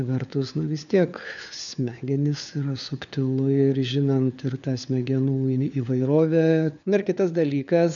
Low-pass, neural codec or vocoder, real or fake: 7.2 kHz; codec, 16 kHz, 4 kbps, FunCodec, trained on LibriTTS, 50 frames a second; fake